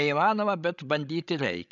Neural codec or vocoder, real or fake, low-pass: codec, 16 kHz, 16 kbps, FreqCodec, larger model; fake; 7.2 kHz